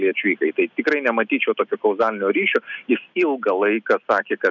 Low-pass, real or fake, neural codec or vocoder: 7.2 kHz; real; none